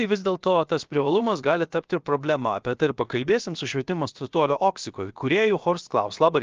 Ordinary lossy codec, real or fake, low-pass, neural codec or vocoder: Opus, 24 kbps; fake; 7.2 kHz; codec, 16 kHz, about 1 kbps, DyCAST, with the encoder's durations